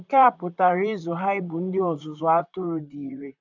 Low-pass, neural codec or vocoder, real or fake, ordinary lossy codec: 7.2 kHz; vocoder, 44.1 kHz, 128 mel bands, Pupu-Vocoder; fake; none